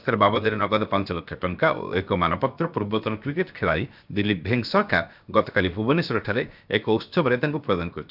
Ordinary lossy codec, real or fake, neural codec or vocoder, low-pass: none; fake; codec, 16 kHz, 0.7 kbps, FocalCodec; 5.4 kHz